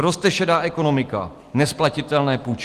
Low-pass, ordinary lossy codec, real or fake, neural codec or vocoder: 14.4 kHz; Opus, 24 kbps; real; none